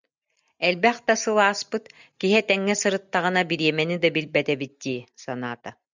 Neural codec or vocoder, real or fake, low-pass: none; real; 7.2 kHz